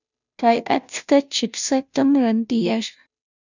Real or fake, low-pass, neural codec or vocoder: fake; 7.2 kHz; codec, 16 kHz, 0.5 kbps, FunCodec, trained on Chinese and English, 25 frames a second